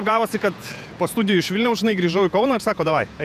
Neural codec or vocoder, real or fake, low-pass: vocoder, 48 kHz, 128 mel bands, Vocos; fake; 14.4 kHz